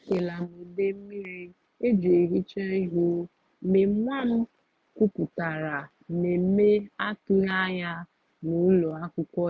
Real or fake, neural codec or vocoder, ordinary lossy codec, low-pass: real; none; none; none